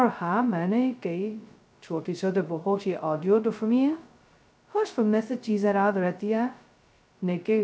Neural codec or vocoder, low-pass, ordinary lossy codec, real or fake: codec, 16 kHz, 0.2 kbps, FocalCodec; none; none; fake